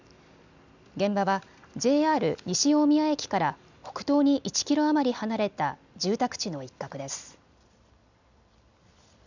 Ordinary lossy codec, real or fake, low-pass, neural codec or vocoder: none; real; 7.2 kHz; none